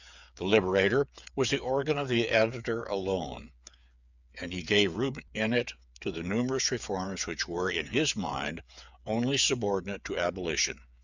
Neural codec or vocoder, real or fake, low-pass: codec, 16 kHz, 8 kbps, FreqCodec, smaller model; fake; 7.2 kHz